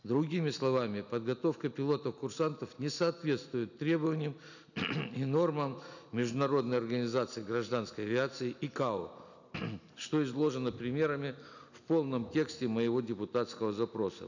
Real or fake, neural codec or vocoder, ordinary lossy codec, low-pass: real; none; none; 7.2 kHz